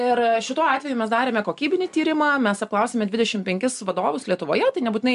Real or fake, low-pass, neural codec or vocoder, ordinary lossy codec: real; 10.8 kHz; none; MP3, 96 kbps